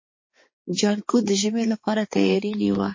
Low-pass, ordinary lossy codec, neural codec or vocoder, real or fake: 7.2 kHz; MP3, 32 kbps; codec, 16 kHz, 4 kbps, X-Codec, HuBERT features, trained on balanced general audio; fake